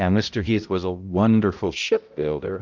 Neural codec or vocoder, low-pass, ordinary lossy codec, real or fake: codec, 16 kHz, 0.5 kbps, X-Codec, HuBERT features, trained on balanced general audio; 7.2 kHz; Opus, 24 kbps; fake